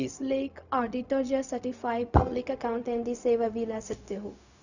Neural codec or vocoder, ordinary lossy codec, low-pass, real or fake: codec, 16 kHz, 0.4 kbps, LongCat-Audio-Codec; none; 7.2 kHz; fake